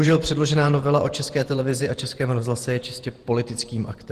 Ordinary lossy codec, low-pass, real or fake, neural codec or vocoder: Opus, 16 kbps; 14.4 kHz; fake; vocoder, 48 kHz, 128 mel bands, Vocos